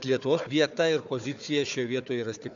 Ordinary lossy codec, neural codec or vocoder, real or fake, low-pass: AAC, 64 kbps; codec, 16 kHz, 4 kbps, FunCodec, trained on Chinese and English, 50 frames a second; fake; 7.2 kHz